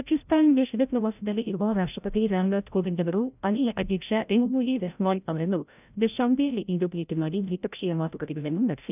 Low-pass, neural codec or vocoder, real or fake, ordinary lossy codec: 3.6 kHz; codec, 16 kHz, 0.5 kbps, FreqCodec, larger model; fake; none